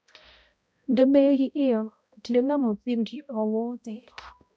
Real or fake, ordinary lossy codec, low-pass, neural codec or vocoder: fake; none; none; codec, 16 kHz, 0.5 kbps, X-Codec, HuBERT features, trained on balanced general audio